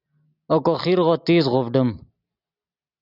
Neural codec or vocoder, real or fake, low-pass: none; real; 5.4 kHz